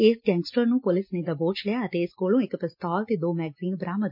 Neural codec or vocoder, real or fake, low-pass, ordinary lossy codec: none; real; 5.4 kHz; MP3, 32 kbps